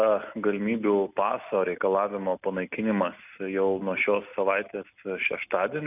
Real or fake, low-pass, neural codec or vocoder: real; 3.6 kHz; none